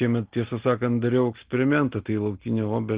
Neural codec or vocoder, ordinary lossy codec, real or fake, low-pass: none; Opus, 16 kbps; real; 3.6 kHz